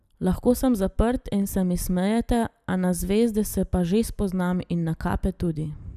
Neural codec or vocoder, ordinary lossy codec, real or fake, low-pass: none; none; real; 14.4 kHz